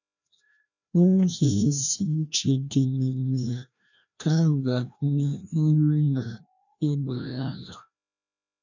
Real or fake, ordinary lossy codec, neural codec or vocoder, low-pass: fake; none; codec, 16 kHz, 1 kbps, FreqCodec, larger model; 7.2 kHz